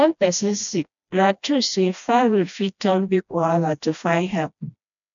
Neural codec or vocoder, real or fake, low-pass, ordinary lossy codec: codec, 16 kHz, 1 kbps, FreqCodec, smaller model; fake; 7.2 kHz; MP3, 96 kbps